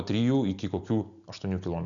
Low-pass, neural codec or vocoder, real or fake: 7.2 kHz; none; real